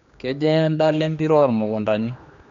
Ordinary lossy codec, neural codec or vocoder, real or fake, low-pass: MP3, 64 kbps; codec, 16 kHz, 2 kbps, X-Codec, HuBERT features, trained on general audio; fake; 7.2 kHz